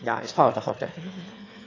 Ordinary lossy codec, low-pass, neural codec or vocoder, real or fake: AAC, 32 kbps; 7.2 kHz; autoencoder, 22.05 kHz, a latent of 192 numbers a frame, VITS, trained on one speaker; fake